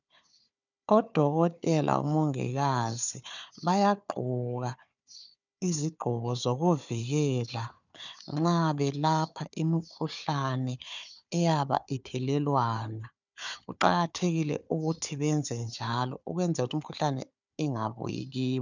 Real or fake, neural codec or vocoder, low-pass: fake; codec, 16 kHz, 4 kbps, FunCodec, trained on Chinese and English, 50 frames a second; 7.2 kHz